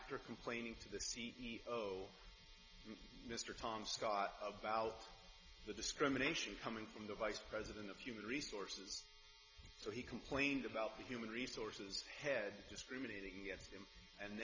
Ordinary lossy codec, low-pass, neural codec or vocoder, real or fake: MP3, 64 kbps; 7.2 kHz; none; real